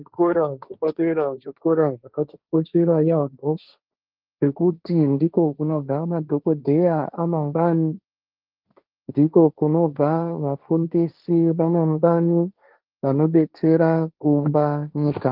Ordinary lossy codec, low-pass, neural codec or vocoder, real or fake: Opus, 24 kbps; 5.4 kHz; codec, 16 kHz, 1.1 kbps, Voila-Tokenizer; fake